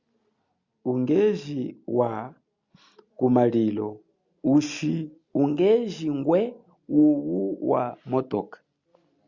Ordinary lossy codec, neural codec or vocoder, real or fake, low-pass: Opus, 64 kbps; none; real; 7.2 kHz